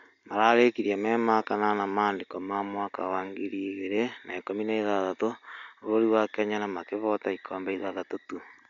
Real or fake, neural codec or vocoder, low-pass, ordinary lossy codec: real; none; 7.2 kHz; none